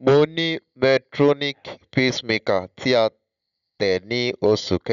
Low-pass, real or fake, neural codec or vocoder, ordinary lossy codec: 7.2 kHz; real; none; none